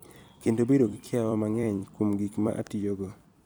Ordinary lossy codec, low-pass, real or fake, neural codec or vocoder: none; none; fake; vocoder, 44.1 kHz, 128 mel bands every 256 samples, BigVGAN v2